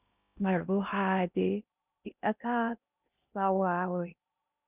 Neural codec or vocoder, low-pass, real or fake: codec, 16 kHz in and 24 kHz out, 0.6 kbps, FocalCodec, streaming, 2048 codes; 3.6 kHz; fake